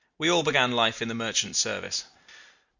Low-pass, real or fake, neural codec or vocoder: 7.2 kHz; real; none